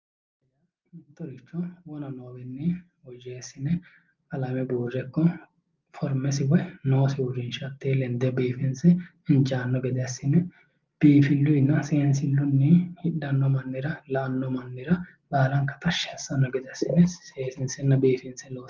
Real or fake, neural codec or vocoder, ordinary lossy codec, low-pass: real; none; Opus, 32 kbps; 7.2 kHz